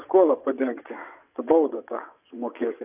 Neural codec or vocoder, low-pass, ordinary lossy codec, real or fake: vocoder, 22.05 kHz, 80 mel bands, WaveNeXt; 3.6 kHz; AAC, 32 kbps; fake